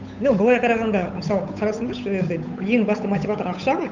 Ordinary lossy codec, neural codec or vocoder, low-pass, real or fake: none; codec, 16 kHz, 8 kbps, FunCodec, trained on Chinese and English, 25 frames a second; 7.2 kHz; fake